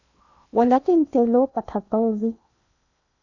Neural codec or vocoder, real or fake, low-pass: codec, 16 kHz in and 24 kHz out, 0.8 kbps, FocalCodec, streaming, 65536 codes; fake; 7.2 kHz